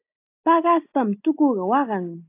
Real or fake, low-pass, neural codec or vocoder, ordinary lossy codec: real; 3.6 kHz; none; AAC, 24 kbps